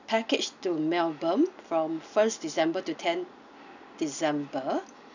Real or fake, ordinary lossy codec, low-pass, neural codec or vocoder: real; none; 7.2 kHz; none